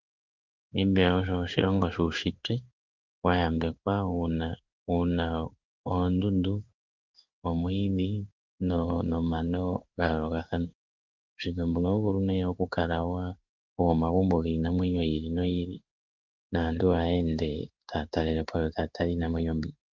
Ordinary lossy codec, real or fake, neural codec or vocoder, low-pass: Opus, 24 kbps; fake; codec, 16 kHz in and 24 kHz out, 1 kbps, XY-Tokenizer; 7.2 kHz